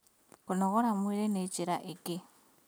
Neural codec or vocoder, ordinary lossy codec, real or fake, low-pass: none; none; real; none